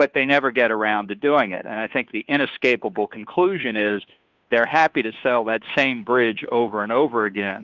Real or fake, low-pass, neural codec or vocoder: fake; 7.2 kHz; codec, 16 kHz, 2 kbps, FunCodec, trained on Chinese and English, 25 frames a second